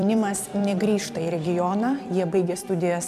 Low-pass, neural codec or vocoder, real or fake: 14.4 kHz; vocoder, 44.1 kHz, 128 mel bands every 256 samples, BigVGAN v2; fake